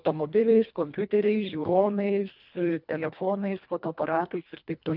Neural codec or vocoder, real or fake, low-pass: codec, 24 kHz, 1.5 kbps, HILCodec; fake; 5.4 kHz